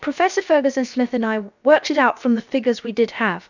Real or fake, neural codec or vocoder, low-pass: fake; codec, 16 kHz, about 1 kbps, DyCAST, with the encoder's durations; 7.2 kHz